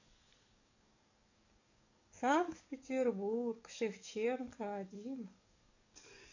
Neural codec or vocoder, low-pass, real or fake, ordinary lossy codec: codec, 44.1 kHz, 7.8 kbps, DAC; 7.2 kHz; fake; none